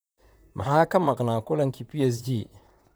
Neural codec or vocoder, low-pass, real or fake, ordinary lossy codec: vocoder, 44.1 kHz, 128 mel bands, Pupu-Vocoder; none; fake; none